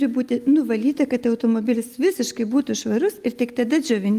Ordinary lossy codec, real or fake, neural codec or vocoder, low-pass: Opus, 32 kbps; real; none; 14.4 kHz